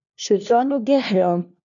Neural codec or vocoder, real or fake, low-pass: codec, 16 kHz, 1 kbps, FunCodec, trained on LibriTTS, 50 frames a second; fake; 7.2 kHz